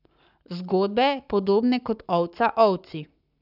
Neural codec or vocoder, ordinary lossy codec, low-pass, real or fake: codec, 44.1 kHz, 7.8 kbps, Pupu-Codec; none; 5.4 kHz; fake